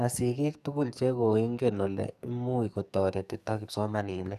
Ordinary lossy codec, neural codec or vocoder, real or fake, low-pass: none; codec, 44.1 kHz, 2.6 kbps, SNAC; fake; 14.4 kHz